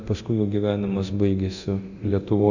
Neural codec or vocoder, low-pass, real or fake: codec, 24 kHz, 0.9 kbps, DualCodec; 7.2 kHz; fake